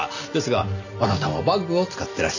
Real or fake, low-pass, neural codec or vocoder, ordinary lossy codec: real; 7.2 kHz; none; none